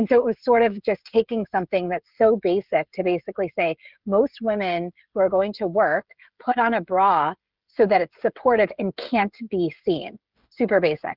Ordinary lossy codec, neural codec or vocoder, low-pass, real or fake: Opus, 32 kbps; codec, 16 kHz, 16 kbps, FreqCodec, smaller model; 5.4 kHz; fake